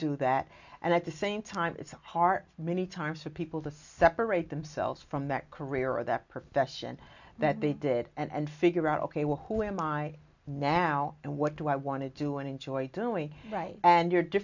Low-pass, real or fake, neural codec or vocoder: 7.2 kHz; real; none